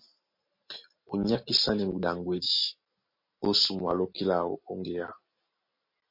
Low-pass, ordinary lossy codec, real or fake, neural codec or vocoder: 5.4 kHz; MP3, 32 kbps; real; none